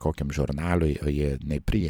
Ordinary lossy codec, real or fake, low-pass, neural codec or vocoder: MP3, 96 kbps; fake; 19.8 kHz; vocoder, 44.1 kHz, 128 mel bands every 256 samples, BigVGAN v2